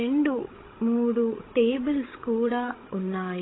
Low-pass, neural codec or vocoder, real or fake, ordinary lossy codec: 7.2 kHz; codec, 16 kHz, 16 kbps, FreqCodec, larger model; fake; AAC, 16 kbps